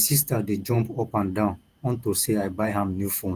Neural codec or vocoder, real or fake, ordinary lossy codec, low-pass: vocoder, 48 kHz, 128 mel bands, Vocos; fake; Opus, 24 kbps; 14.4 kHz